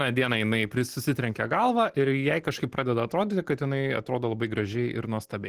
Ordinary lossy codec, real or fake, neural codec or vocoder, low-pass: Opus, 16 kbps; real; none; 14.4 kHz